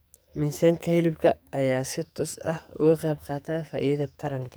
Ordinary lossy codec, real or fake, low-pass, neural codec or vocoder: none; fake; none; codec, 44.1 kHz, 2.6 kbps, SNAC